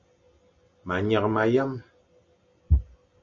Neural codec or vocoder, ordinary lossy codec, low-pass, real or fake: none; MP3, 48 kbps; 7.2 kHz; real